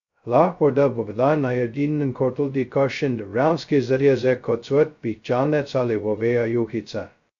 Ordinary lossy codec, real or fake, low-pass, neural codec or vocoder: AAC, 64 kbps; fake; 7.2 kHz; codec, 16 kHz, 0.2 kbps, FocalCodec